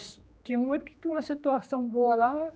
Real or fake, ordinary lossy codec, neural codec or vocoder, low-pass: fake; none; codec, 16 kHz, 2 kbps, X-Codec, HuBERT features, trained on general audio; none